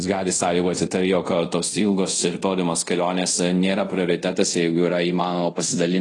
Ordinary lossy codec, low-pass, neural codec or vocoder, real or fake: AAC, 32 kbps; 10.8 kHz; codec, 24 kHz, 0.5 kbps, DualCodec; fake